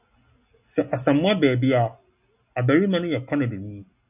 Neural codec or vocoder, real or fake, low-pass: none; real; 3.6 kHz